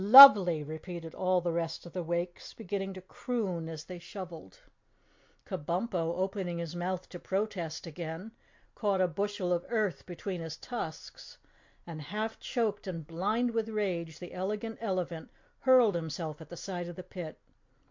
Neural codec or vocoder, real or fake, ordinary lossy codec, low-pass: none; real; MP3, 48 kbps; 7.2 kHz